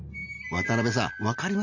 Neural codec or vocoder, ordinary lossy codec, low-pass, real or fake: none; none; 7.2 kHz; real